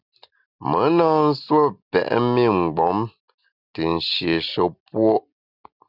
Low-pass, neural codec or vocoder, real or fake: 5.4 kHz; none; real